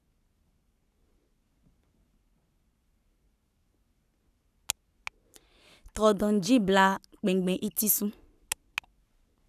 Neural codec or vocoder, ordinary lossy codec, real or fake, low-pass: codec, 44.1 kHz, 7.8 kbps, Pupu-Codec; none; fake; 14.4 kHz